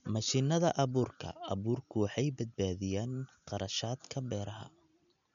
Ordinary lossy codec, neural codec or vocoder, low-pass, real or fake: none; none; 7.2 kHz; real